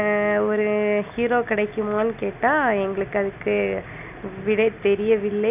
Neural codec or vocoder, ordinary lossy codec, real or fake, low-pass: none; none; real; 3.6 kHz